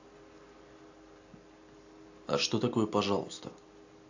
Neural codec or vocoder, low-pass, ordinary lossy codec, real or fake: none; 7.2 kHz; AAC, 48 kbps; real